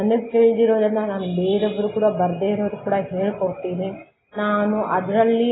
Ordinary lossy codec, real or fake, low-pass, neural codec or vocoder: AAC, 16 kbps; real; 7.2 kHz; none